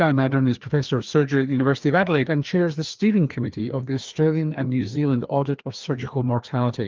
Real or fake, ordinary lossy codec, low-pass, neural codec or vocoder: fake; Opus, 32 kbps; 7.2 kHz; codec, 16 kHz, 2 kbps, FreqCodec, larger model